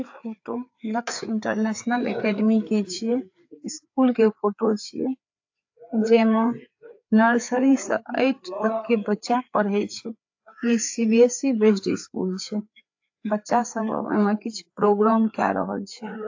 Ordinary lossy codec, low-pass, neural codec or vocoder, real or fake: AAC, 48 kbps; 7.2 kHz; codec, 16 kHz, 4 kbps, FreqCodec, larger model; fake